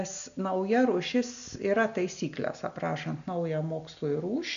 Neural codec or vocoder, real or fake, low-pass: none; real; 7.2 kHz